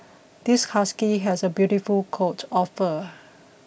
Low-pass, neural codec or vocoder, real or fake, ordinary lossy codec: none; none; real; none